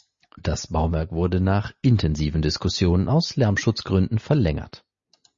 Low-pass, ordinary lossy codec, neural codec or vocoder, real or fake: 7.2 kHz; MP3, 32 kbps; none; real